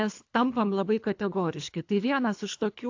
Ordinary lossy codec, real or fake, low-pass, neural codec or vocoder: AAC, 48 kbps; fake; 7.2 kHz; codec, 24 kHz, 3 kbps, HILCodec